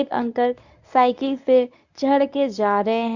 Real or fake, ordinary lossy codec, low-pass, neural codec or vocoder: fake; none; 7.2 kHz; codec, 24 kHz, 0.9 kbps, WavTokenizer, medium speech release version 1